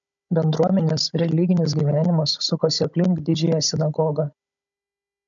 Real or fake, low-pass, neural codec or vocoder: fake; 7.2 kHz; codec, 16 kHz, 16 kbps, FunCodec, trained on Chinese and English, 50 frames a second